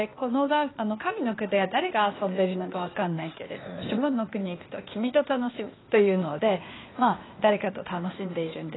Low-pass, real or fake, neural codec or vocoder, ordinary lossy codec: 7.2 kHz; fake; codec, 16 kHz, 0.8 kbps, ZipCodec; AAC, 16 kbps